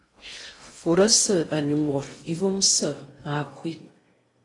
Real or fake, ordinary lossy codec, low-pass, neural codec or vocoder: fake; AAC, 32 kbps; 10.8 kHz; codec, 16 kHz in and 24 kHz out, 0.6 kbps, FocalCodec, streaming, 2048 codes